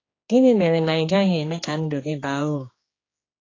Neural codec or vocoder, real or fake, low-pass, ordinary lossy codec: codec, 16 kHz, 2 kbps, X-Codec, HuBERT features, trained on general audio; fake; 7.2 kHz; AAC, 32 kbps